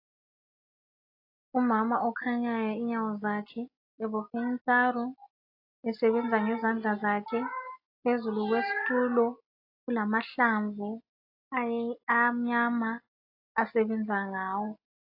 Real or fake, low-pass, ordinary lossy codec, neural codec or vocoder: real; 5.4 kHz; AAC, 32 kbps; none